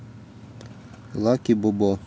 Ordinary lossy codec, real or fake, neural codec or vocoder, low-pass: none; real; none; none